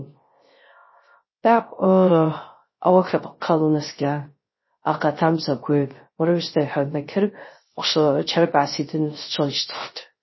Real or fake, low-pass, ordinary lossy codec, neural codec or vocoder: fake; 7.2 kHz; MP3, 24 kbps; codec, 16 kHz, 0.3 kbps, FocalCodec